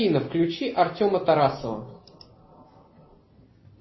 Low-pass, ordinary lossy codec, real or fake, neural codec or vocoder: 7.2 kHz; MP3, 24 kbps; real; none